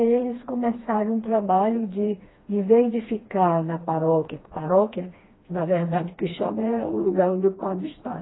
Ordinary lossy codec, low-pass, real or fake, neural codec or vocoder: AAC, 16 kbps; 7.2 kHz; fake; codec, 16 kHz, 2 kbps, FreqCodec, smaller model